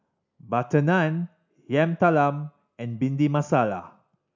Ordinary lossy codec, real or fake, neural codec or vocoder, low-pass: none; real; none; 7.2 kHz